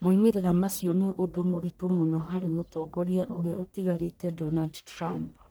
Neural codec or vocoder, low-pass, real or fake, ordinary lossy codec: codec, 44.1 kHz, 1.7 kbps, Pupu-Codec; none; fake; none